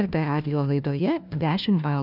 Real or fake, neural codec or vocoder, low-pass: fake; codec, 16 kHz, 1 kbps, FunCodec, trained on LibriTTS, 50 frames a second; 5.4 kHz